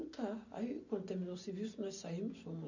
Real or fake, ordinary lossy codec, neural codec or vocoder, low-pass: real; none; none; 7.2 kHz